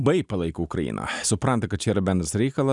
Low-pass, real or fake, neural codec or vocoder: 10.8 kHz; real; none